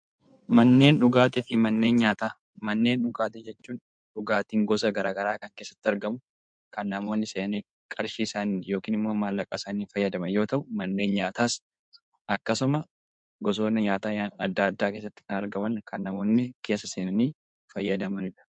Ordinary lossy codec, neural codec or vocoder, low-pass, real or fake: MP3, 64 kbps; codec, 16 kHz in and 24 kHz out, 2.2 kbps, FireRedTTS-2 codec; 9.9 kHz; fake